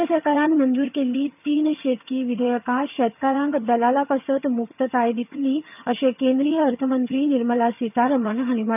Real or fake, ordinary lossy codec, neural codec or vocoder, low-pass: fake; none; vocoder, 22.05 kHz, 80 mel bands, HiFi-GAN; 3.6 kHz